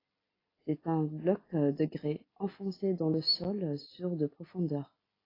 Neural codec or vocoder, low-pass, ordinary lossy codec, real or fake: none; 5.4 kHz; AAC, 24 kbps; real